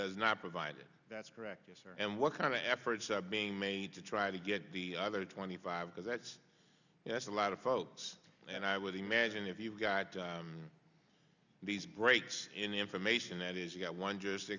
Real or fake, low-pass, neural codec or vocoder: real; 7.2 kHz; none